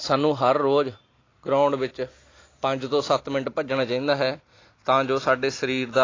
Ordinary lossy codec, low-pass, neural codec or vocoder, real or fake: AAC, 32 kbps; 7.2 kHz; none; real